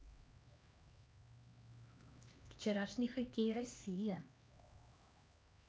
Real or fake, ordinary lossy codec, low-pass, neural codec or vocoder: fake; none; none; codec, 16 kHz, 2 kbps, X-Codec, HuBERT features, trained on LibriSpeech